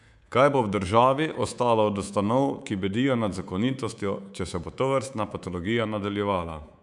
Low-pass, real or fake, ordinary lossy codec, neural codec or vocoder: 10.8 kHz; fake; none; codec, 24 kHz, 3.1 kbps, DualCodec